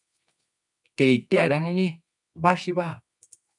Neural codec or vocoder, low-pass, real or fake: codec, 24 kHz, 0.9 kbps, WavTokenizer, medium music audio release; 10.8 kHz; fake